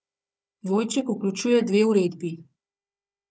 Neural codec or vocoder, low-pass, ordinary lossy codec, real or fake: codec, 16 kHz, 4 kbps, FunCodec, trained on Chinese and English, 50 frames a second; none; none; fake